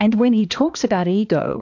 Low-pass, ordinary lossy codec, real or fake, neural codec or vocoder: 7.2 kHz; AAC, 48 kbps; fake; codec, 16 kHz, 2 kbps, X-Codec, HuBERT features, trained on balanced general audio